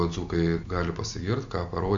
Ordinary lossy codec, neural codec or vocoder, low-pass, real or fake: MP3, 64 kbps; none; 7.2 kHz; real